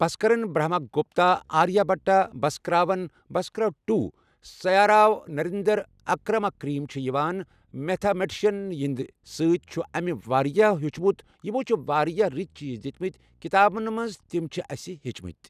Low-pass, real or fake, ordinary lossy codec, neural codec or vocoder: 14.4 kHz; real; none; none